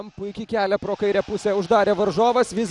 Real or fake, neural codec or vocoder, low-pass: real; none; 10.8 kHz